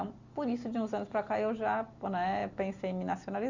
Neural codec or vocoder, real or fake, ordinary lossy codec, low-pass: none; real; Opus, 64 kbps; 7.2 kHz